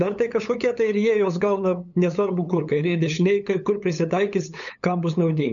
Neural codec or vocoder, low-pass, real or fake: codec, 16 kHz, 8 kbps, FunCodec, trained on LibriTTS, 25 frames a second; 7.2 kHz; fake